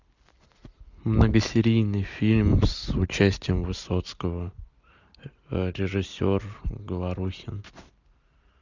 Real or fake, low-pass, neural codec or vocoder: real; 7.2 kHz; none